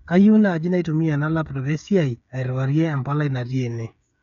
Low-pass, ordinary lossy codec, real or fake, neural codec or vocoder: 7.2 kHz; none; fake; codec, 16 kHz, 8 kbps, FreqCodec, smaller model